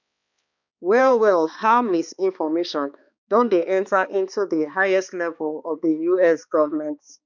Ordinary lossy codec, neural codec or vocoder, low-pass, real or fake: none; codec, 16 kHz, 2 kbps, X-Codec, HuBERT features, trained on balanced general audio; 7.2 kHz; fake